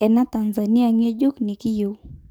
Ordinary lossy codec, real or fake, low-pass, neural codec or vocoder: none; fake; none; codec, 44.1 kHz, 7.8 kbps, DAC